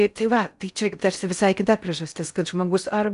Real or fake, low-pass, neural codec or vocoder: fake; 10.8 kHz; codec, 16 kHz in and 24 kHz out, 0.6 kbps, FocalCodec, streaming, 4096 codes